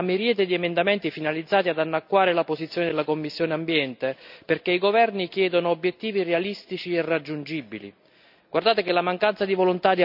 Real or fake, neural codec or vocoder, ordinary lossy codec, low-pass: real; none; none; 5.4 kHz